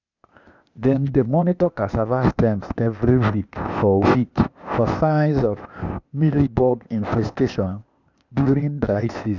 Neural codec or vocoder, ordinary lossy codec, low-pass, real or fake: codec, 16 kHz, 0.8 kbps, ZipCodec; none; 7.2 kHz; fake